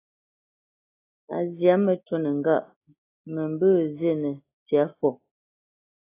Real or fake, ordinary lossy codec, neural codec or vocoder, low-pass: real; AAC, 16 kbps; none; 3.6 kHz